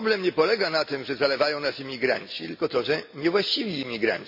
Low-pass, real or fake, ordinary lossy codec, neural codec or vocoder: 5.4 kHz; real; MP3, 32 kbps; none